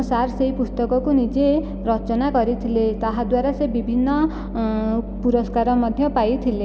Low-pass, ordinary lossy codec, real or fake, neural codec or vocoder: none; none; real; none